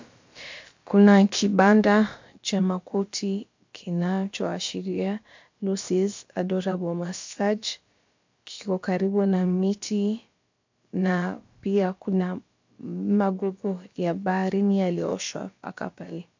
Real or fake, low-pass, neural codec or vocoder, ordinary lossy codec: fake; 7.2 kHz; codec, 16 kHz, about 1 kbps, DyCAST, with the encoder's durations; MP3, 48 kbps